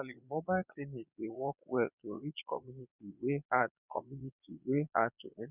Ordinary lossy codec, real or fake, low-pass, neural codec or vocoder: none; fake; 3.6 kHz; vocoder, 22.05 kHz, 80 mel bands, Vocos